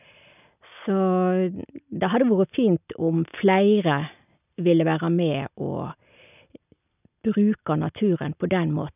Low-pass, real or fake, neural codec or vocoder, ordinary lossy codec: 3.6 kHz; real; none; none